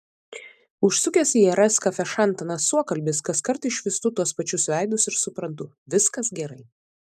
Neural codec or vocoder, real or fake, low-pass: none; real; 14.4 kHz